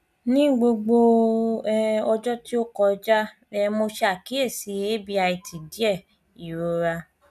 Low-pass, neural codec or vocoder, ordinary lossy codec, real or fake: 14.4 kHz; none; none; real